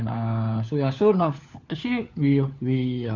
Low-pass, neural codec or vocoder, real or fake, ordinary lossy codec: 7.2 kHz; codec, 16 kHz, 4 kbps, FunCodec, trained on LibriTTS, 50 frames a second; fake; none